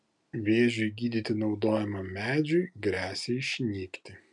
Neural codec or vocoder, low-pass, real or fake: none; 10.8 kHz; real